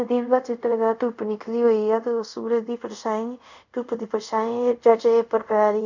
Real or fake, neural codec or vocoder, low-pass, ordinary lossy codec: fake; codec, 24 kHz, 0.5 kbps, DualCodec; 7.2 kHz; none